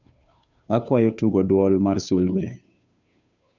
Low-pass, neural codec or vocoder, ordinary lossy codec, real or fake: 7.2 kHz; codec, 16 kHz, 2 kbps, FunCodec, trained on Chinese and English, 25 frames a second; none; fake